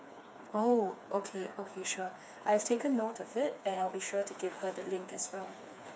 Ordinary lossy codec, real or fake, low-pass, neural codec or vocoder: none; fake; none; codec, 16 kHz, 4 kbps, FreqCodec, smaller model